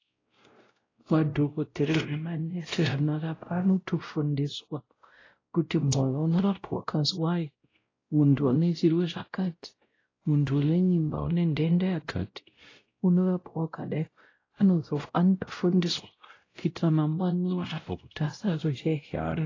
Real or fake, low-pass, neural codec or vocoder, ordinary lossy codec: fake; 7.2 kHz; codec, 16 kHz, 0.5 kbps, X-Codec, WavLM features, trained on Multilingual LibriSpeech; AAC, 32 kbps